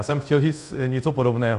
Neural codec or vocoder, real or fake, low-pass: codec, 24 kHz, 0.5 kbps, DualCodec; fake; 10.8 kHz